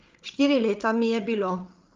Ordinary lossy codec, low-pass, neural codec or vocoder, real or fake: Opus, 24 kbps; 7.2 kHz; codec, 16 kHz, 8 kbps, FreqCodec, larger model; fake